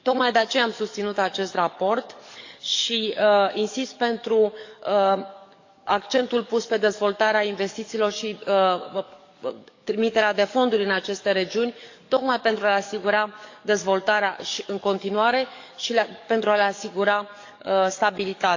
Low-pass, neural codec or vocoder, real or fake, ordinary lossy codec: 7.2 kHz; codec, 44.1 kHz, 7.8 kbps, DAC; fake; AAC, 48 kbps